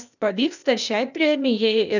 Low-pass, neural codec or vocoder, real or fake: 7.2 kHz; codec, 16 kHz, 0.8 kbps, ZipCodec; fake